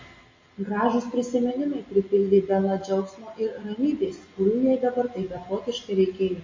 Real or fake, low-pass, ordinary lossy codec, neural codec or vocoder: real; 7.2 kHz; MP3, 32 kbps; none